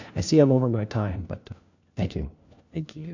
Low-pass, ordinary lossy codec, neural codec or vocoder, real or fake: 7.2 kHz; AAC, 48 kbps; codec, 16 kHz, 1 kbps, FunCodec, trained on LibriTTS, 50 frames a second; fake